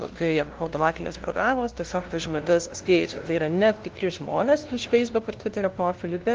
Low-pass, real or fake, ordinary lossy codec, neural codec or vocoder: 7.2 kHz; fake; Opus, 16 kbps; codec, 16 kHz, 0.5 kbps, FunCodec, trained on LibriTTS, 25 frames a second